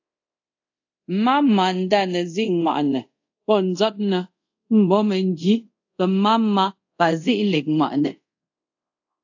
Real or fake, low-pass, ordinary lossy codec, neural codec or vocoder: fake; 7.2 kHz; AAC, 48 kbps; codec, 24 kHz, 0.5 kbps, DualCodec